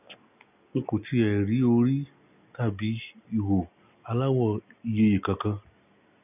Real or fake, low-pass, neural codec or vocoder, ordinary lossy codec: real; 3.6 kHz; none; none